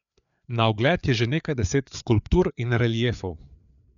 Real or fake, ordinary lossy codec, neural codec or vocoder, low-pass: fake; none; codec, 16 kHz, 4 kbps, FreqCodec, larger model; 7.2 kHz